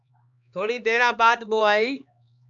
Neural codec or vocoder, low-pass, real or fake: codec, 16 kHz, 2 kbps, X-Codec, HuBERT features, trained on LibriSpeech; 7.2 kHz; fake